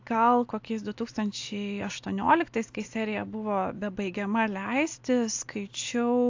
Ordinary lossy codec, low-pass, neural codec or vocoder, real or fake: AAC, 48 kbps; 7.2 kHz; none; real